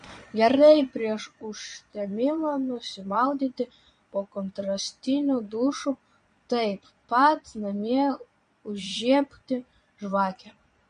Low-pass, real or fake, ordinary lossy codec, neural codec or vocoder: 9.9 kHz; fake; MP3, 48 kbps; vocoder, 22.05 kHz, 80 mel bands, Vocos